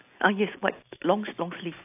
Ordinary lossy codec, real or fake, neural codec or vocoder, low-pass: AAC, 32 kbps; real; none; 3.6 kHz